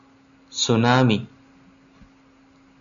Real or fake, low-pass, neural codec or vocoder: real; 7.2 kHz; none